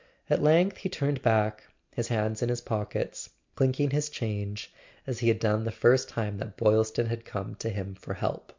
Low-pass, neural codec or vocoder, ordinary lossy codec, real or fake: 7.2 kHz; none; MP3, 64 kbps; real